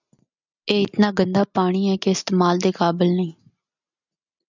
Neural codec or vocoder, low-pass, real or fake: none; 7.2 kHz; real